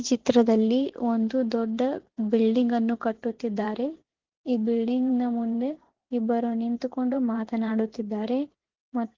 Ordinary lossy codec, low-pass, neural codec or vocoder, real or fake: Opus, 16 kbps; 7.2 kHz; vocoder, 44.1 kHz, 128 mel bands every 512 samples, BigVGAN v2; fake